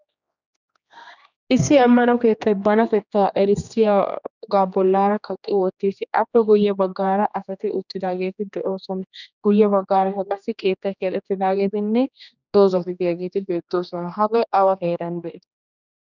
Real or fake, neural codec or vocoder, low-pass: fake; codec, 16 kHz, 2 kbps, X-Codec, HuBERT features, trained on general audio; 7.2 kHz